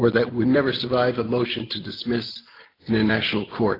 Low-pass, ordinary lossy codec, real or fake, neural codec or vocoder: 5.4 kHz; AAC, 24 kbps; real; none